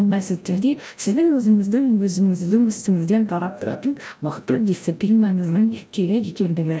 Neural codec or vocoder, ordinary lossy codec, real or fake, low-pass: codec, 16 kHz, 0.5 kbps, FreqCodec, larger model; none; fake; none